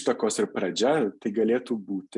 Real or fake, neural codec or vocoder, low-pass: real; none; 10.8 kHz